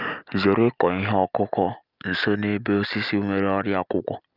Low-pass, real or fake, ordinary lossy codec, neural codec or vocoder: 5.4 kHz; real; Opus, 24 kbps; none